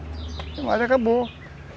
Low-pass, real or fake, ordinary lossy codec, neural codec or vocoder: none; real; none; none